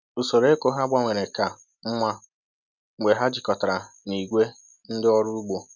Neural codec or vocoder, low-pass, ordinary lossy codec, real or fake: none; 7.2 kHz; none; real